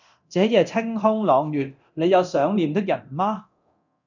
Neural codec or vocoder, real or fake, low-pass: codec, 24 kHz, 0.9 kbps, DualCodec; fake; 7.2 kHz